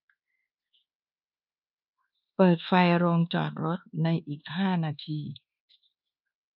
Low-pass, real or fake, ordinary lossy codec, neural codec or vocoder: 5.4 kHz; fake; none; codec, 24 kHz, 1.2 kbps, DualCodec